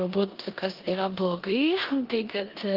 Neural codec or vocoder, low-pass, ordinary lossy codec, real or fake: codec, 16 kHz in and 24 kHz out, 0.9 kbps, LongCat-Audio-Codec, four codebook decoder; 5.4 kHz; Opus, 32 kbps; fake